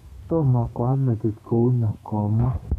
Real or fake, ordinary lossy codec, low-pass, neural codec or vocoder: fake; MP3, 96 kbps; 14.4 kHz; codec, 32 kHz, 1.9 kbps, SNAC